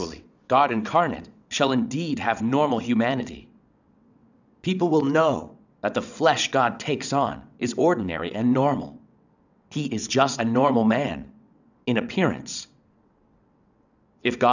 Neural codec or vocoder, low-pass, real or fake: vocoder, 22.05 kHz, 80 mel bands, WaveNeXt; 7.2 kHz; fake